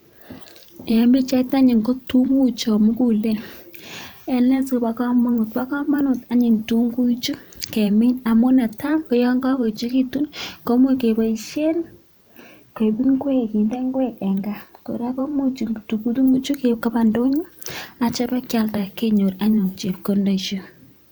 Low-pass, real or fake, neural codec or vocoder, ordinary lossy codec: none; fake; vocoder, 44.1 kHz, 128 mel bands every 512 samples, BigVGAN v2; none